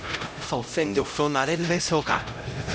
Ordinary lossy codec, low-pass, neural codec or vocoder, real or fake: none; none; codec, 16 kHz, 0.5 kbps, X-Codec, HuBERT features, trained on LibriSpeech; fake